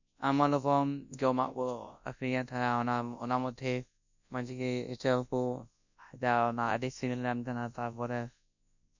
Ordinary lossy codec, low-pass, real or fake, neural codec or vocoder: none; 7.2 kHz; fake; codec, 24 kHz, 0.9 kbps, WavTokenizer, large speech release